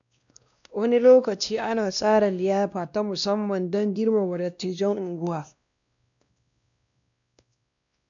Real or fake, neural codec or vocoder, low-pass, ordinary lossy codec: fake; codec, 16 kHz, 1 kbps, X-Codec, WavLM features, trained on Multilingual LibriSpeech; 7.2 kHz; none